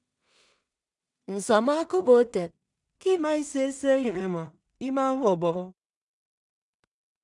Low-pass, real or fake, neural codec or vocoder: 10.8 kHz; fake; codec, 16 kHz in and 24 kHz out, 0.4 kbps, LongCat-Audio-Codec, two codebook decoder